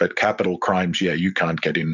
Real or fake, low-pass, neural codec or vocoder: real; 7.2 kHz; none